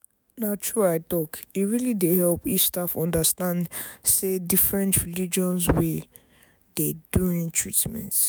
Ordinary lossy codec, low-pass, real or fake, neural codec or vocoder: none; none; fake; autoencoder, 48 kHz, 128 numbers a frame, DAC-VAE, trained on Japanese speech